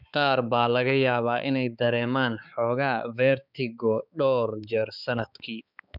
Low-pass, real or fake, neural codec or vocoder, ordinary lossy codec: 5.4 kHz; fake; codec, 16 kHz, 4 kbps, X-Codec, HuBERT features, trained on balanced general audio; none